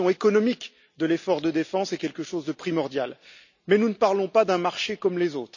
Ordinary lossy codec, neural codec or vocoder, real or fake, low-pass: none; none; real; 7.2 kHz